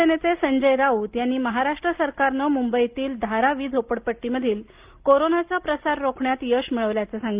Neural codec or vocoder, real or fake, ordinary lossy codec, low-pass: none; real; Opus, 32 kbps; 3.6 kHz